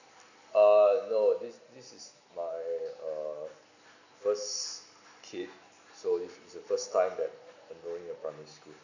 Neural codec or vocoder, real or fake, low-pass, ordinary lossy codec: none; real; 7.2 kHz; none